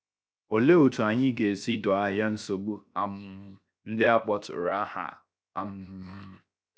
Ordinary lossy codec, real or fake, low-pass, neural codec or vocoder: none; fake; none; codec, 16 kHz, 0.7 kbps, FocalCodec